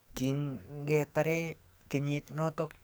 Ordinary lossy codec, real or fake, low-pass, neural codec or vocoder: none; fake; none; codec, 44.1 kHz, 2.6 kbps, SNAC